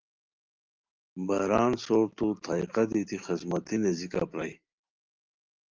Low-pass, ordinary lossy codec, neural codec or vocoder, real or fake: 7.2 kHz; Opus, 24 kbps; none; real